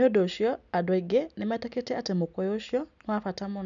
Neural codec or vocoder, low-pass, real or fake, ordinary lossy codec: none; 7.2 kHz; real; none